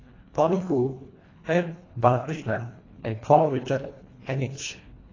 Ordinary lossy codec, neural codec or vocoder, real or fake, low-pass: AAC, 32 kbps; codec, 24 kHz, 1.5 kbps, HILCodec; fake; 7.2 kHz